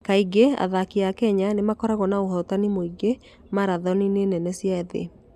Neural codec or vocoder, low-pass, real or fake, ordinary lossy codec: none; 14.4 kHz; real; none